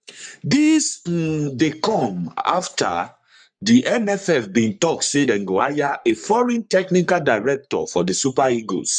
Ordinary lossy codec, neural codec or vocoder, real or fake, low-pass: none; codec, 44.1 kHz, 3.4 kbps, Pupu-Codec; fake; 9.9 kHz